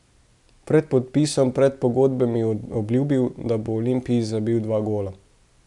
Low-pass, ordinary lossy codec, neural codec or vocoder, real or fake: 10.8 kHz; none; none; real